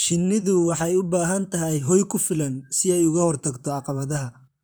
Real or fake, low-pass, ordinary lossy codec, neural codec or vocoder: fake; none; none; vocoder, 44.1 kHz, 128 mel bands, Pupu-Vocoder